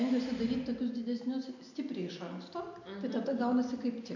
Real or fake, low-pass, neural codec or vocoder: real; 7.2 kHz; none